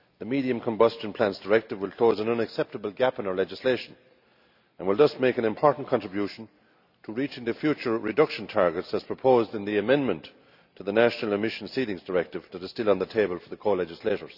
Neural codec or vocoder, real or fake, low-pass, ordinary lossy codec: none; real; 5.4 kHz; none